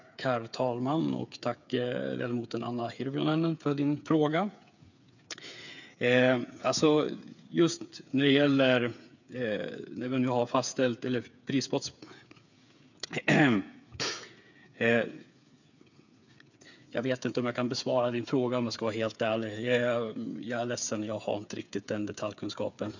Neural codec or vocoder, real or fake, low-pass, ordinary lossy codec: codec, 16 kHz, 8 kbps, FreqCodec, smaller model; fake; 7.2 kHz; none